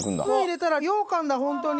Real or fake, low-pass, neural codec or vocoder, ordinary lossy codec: real; none; none; none